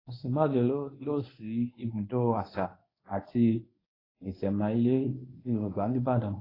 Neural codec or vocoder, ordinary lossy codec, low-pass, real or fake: codec, 24 kHz, 0.9 kbps, WavTokenizer, medium speech release version 1; AAC, 24 kbps; 5.4 kHz; fake